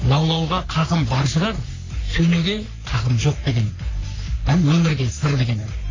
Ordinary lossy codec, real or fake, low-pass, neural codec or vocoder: AAC, 32 kbps; fake; 7.2 kHz; codec, 44.1 kHz, 3.4 kbps, Pupu-Codec